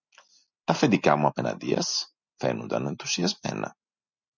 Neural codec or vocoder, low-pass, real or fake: none; 7.2 kHz; real